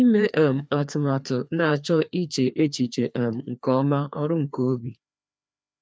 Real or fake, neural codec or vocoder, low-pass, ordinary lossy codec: fake; codec, 16 kHz, 2 kbps, FreqCodec, larger model; none; none